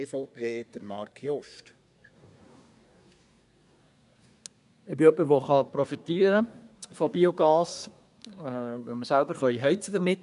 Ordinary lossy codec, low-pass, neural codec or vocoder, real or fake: none; 10.8 kHz; codec, 24 kHz, 1 kbps, SNAC; fake